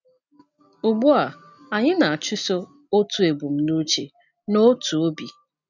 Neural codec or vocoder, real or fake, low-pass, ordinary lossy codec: none; real; 7.2 kHz; none